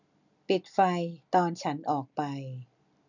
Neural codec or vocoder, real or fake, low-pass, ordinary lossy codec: none; real; 7.2 kHz; none